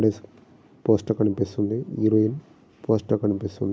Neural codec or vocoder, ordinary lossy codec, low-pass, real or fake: none; none; none; real